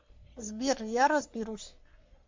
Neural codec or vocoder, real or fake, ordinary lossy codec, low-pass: codec, 44.1 kHz, 3.4 kbps, Pupu-Codec; fake; MP3, 48 kbps; 7.2 kHz